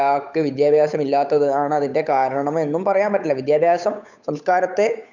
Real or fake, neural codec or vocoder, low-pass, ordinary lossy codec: fake; codec, 16 kHz, 8 kbps, FunCodec, trained on Chinese and English, 25 frames a second; 7.2 kHz; none